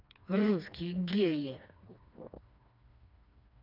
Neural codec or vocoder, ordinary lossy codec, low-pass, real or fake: codec, 16 kHz, 2 kbps, FreqCodec, smaller model; none; 5.4 kHz; fake